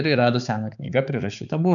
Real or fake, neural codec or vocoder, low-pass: fake; codec, 16 kHz, 4 kbps, X-Codec, HuBERT features, trained on balanced general audio; 7.2 kHz